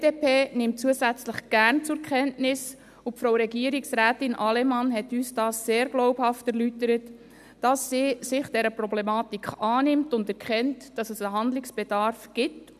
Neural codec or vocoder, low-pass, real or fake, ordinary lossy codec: none; 14.4 kHz; real; none